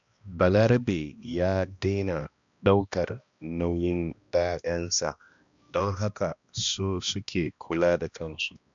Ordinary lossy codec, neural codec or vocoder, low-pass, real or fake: MP3, 64 kbps; codec, 16 kHz, 1 kbps, X-Codec, HuBERT features, trained on balanced general audio; 7.2 kHz; fake